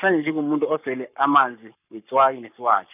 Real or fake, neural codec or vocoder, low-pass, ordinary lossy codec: fake; codec, 44.1 kHz, 7.8 kbps, Pupu-Codec; 3.6 kHz; none